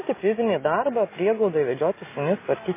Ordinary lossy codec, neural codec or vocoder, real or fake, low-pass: MP3, 16 kbps; none; real; 3.6 kHz